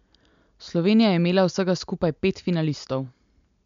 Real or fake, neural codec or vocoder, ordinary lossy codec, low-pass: real; none; MP3, 64 kbps; 7.2 kHz